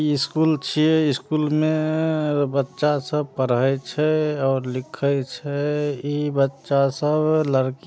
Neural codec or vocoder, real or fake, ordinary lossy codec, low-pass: none; real; none; none